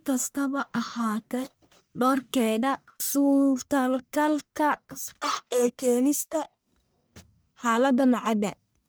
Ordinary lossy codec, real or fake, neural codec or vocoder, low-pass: none; fake; codec, 44.1 kHz, 1.7 kbps, Pupu-Codec; none